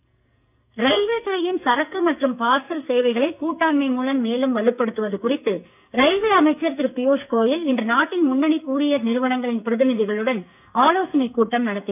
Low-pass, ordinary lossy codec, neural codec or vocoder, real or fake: 3.6 kHz; none; codec, 44.1 kHz, 2.6 kbps, SNAC; fake